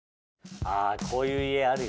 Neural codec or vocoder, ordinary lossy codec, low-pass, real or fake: none; none; none; real